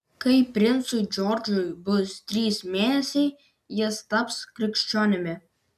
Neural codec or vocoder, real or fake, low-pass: none; real; 14.4 kHz